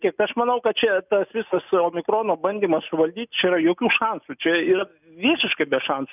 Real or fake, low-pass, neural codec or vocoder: real; 3.6 kHz; none